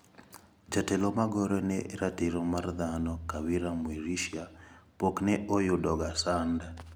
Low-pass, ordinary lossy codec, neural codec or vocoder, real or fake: none; none; none; real